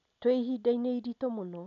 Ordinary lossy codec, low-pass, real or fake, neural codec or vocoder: none; 7.2 kHz; real; none